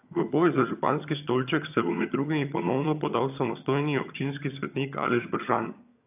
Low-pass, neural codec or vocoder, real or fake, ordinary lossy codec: 3.6 kHz; vocoder, 22.05 kHz, 80 mel bands, HiFi-GAN; fake; AAC, 32 kbps